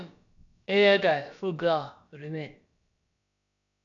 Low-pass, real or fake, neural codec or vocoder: 7.2 kHz; fake; codec, 16 kHz, about 1 kbps, DyCAST, with the encoder's durations